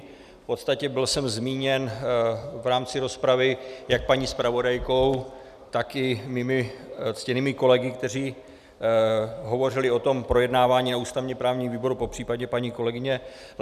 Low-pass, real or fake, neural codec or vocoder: 14.4 kHz; real; none